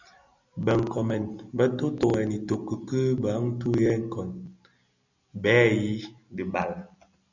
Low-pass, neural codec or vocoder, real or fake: 7.2 kHz; none; real